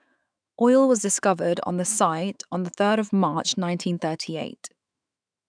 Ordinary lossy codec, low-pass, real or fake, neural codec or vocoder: none; 9.9 kHz; fake; autoencoder, 48 kHz, 128 numbers a frame, DAC-VAE, trained on Japanese speech